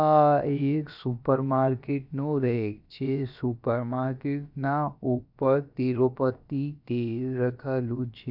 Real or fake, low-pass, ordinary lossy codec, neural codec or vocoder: fake; 5.4 kHz; none; codec, 16 kHz, about 1 kbps, DyCAST, with the encoder's durations